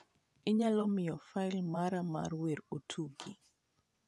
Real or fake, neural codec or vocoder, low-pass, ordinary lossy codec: fake; vocoder, 24 kHz, 100 mel bands, Vocos; none; none